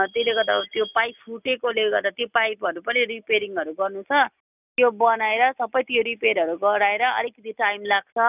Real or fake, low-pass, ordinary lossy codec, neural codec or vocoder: real; 3.6 kHz; none; none